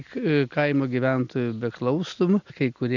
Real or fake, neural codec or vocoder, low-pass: real; none; 7.2 kHz